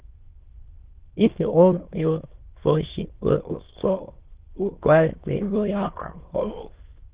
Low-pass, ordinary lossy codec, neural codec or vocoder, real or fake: 3.6 kHz; Opus, 16 kbps; autoencoder, 22.05 kHz, a latent of 192 numbers a frame, VITS, trained on many speakers; fake